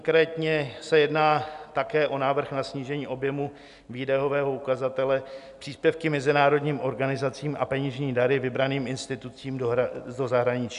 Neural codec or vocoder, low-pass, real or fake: none; 10.8 kHz; real